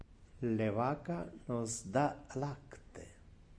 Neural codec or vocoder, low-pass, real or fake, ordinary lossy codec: none; 9.9 kHz; real; MP3, 48 kbps